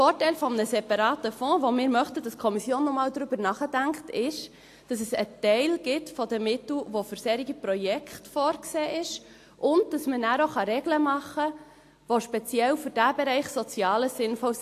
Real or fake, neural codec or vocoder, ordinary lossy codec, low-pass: real; none; AAC, 64 kbps; 14.4 kHz